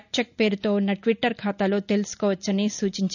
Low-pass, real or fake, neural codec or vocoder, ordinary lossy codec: 7.2 kHz; real; none; none